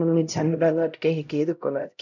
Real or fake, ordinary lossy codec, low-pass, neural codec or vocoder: fake; none; 7.2 kHz; codec, 16 kHz, 0.5 kbps, X-Codec, HuBERT features, trained on LibriSpeech